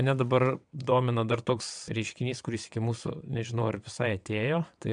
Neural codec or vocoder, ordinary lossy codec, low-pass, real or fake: vocoder, 22.05 kHz, 80 mel bands, WaveNeXt; MP3, 96 kbps; 9.9 kHz; fake